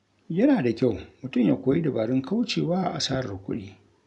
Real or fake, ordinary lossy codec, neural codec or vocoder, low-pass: real; none; none; 10.8 kHz